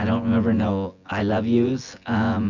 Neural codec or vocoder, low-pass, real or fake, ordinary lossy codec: vocoder, 24 kHz, 100 mel bands, Vocos; 7.2 kHz; fake; Opus, 64 kbps